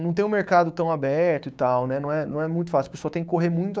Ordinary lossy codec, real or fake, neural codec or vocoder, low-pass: none; fake; codec, 16 kHz, 6 kbps, DAC; none